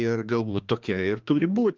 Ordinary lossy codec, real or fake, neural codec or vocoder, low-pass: Opus, 16 kbps; fake; codec, 16 kHz, 2 kbps, X-Codec, HuBERT features, trained on balanced general audio; 7.2 kHz